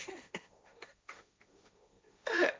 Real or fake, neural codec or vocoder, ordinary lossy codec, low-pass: fake; codec, 16 kHz, 1.1 kbps, Voila-Tokenizer; none; none